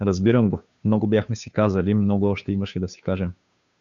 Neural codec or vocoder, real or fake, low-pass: codec, 16 kHz, 2 kbps, FunCodec, trained on Chinese and English, 25 frames a second; fake; 7.2 kHz